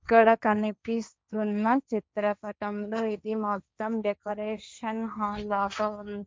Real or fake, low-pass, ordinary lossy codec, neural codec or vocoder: fake; none; none; codec, 16 kHz, 1.1 kbps, Voila-Tokenizer